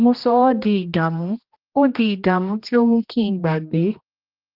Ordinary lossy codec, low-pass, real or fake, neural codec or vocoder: Opus, 32 kbps; 5.4 kHz; fake; codec, 16 kHz, 1 kbps, X-Codec, HuBERT features, trained on general audio